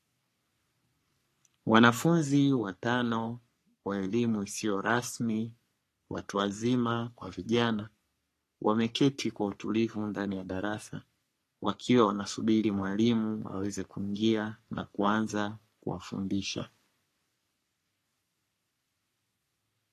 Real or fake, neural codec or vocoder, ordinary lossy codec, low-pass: fake; codec, 44.1 kHz, 3.4 kbps, Pupu-Codec; AAC, 48 kbps; 14.4 kHz